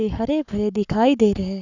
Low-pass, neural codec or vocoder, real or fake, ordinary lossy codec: 7.2 kHz; codec, 44.1 kHz, 7.8 kbps, Pupu-Codec; fake; none